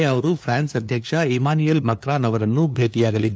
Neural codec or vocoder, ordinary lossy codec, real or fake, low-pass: codec, 16 kHz, 2 kbps, FunCodec, trained on LibriTTS, 25 frames a second; none; fake; none